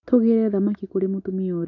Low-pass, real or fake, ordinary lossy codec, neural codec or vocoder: 7.2 kHz; real; none; none